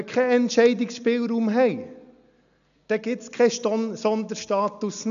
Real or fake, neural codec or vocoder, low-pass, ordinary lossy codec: real; none; 7.2 kHz; none